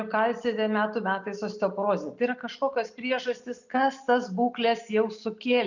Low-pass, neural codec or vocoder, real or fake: 7.2 kHz; none; real